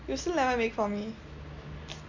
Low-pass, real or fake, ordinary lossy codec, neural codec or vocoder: 7.2 kHz; real; none; none